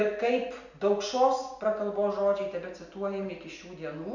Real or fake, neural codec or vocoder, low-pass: real; none; 7.2 kHz